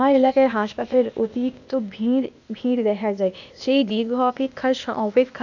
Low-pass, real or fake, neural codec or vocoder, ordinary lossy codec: 7.2 kHz; fake; codec, 16 kHz, 0.8 kbps, ZipCodec; none